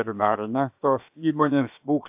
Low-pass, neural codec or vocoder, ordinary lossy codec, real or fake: 3.6 kHz; codec, 16 kHz, 0.7 kbps, FocalCodec; AAC, 32 kbps; fake